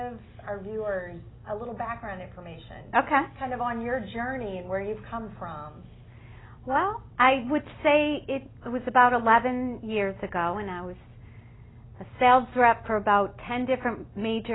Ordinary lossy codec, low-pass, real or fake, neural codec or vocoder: AAC, 16 kbps; 7.2 kHz; real; none